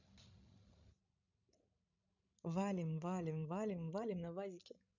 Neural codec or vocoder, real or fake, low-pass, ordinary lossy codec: codec, 16 kHz, 16 kbps, FreqCodec, larger model; fake; 7.2 kHz; none